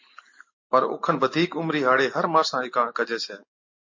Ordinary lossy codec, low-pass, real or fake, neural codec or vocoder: MP3, 32 kbps; 7.2 kHz; real; none